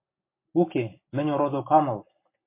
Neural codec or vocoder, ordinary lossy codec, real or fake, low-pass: none; MP3, 24 kbps; real; 3.6 kHz